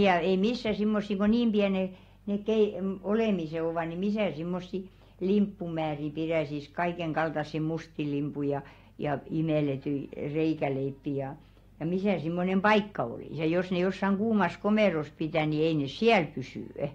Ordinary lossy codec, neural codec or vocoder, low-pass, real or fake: AAC, 48 kbps; none; 19.8 kHz; real